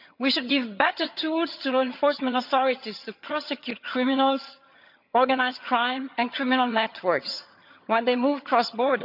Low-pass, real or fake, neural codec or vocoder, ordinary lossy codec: 5.4 kHz; fake; vocoder, 22.05 kHz, 80 mel bands, HiFi-GAN; none